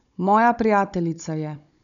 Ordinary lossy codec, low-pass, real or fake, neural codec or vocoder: none; 7.2 kHz; fake; codec, 16 kHz, 16 kbps, FunCodec, trained on Chinese and English, 50 frames a second